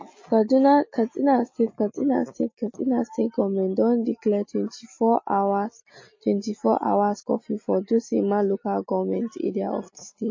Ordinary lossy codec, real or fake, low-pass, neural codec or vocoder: MP3, 32 kbps; real; 7.2 kHz; none